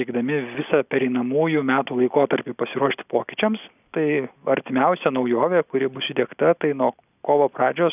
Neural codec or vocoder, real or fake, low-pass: none; real; 3.6 kHz